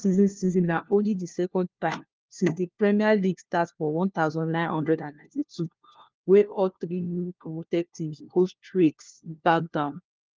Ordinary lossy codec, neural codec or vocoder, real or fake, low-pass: Opus, 32 kbps; codec, 16 kHz, 1 kbps, FunCodec, trained on LibriTTS, 50 frames a second; fake; 7.2 kHz